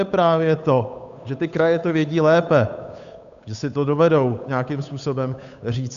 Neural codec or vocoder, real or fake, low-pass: codec, 16 kHz, 8 kbps, FunCodec, trained on Chinese and English, 25 frames a second; fake; 7.2 kHz